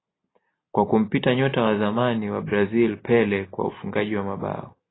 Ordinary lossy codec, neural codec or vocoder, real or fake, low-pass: AAC, 16 kbps; none; real; 7.2 kHz